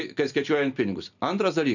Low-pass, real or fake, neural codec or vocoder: 7.2 kHz; real; none